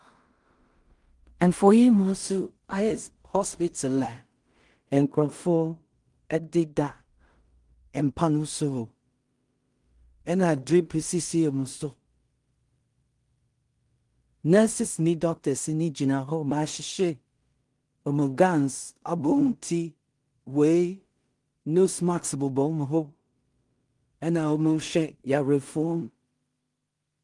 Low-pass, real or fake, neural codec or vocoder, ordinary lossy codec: 10.8 kHz; fake; codec, 16 kHz in and 24 kHz out, 0.4 kbps, LongCat-Audio-Codec, two codebook decoder; Opus, 24 kbps